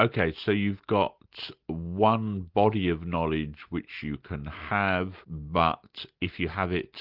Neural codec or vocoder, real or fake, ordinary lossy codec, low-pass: none; real; Opus, 32 kbps; 5.4 kHz